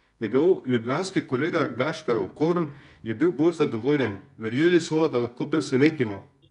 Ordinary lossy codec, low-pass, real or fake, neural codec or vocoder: none; 10.8 kHz; fake; codec, 24 kHz, 0.9 kbps, WavTokenizer, medium music audio release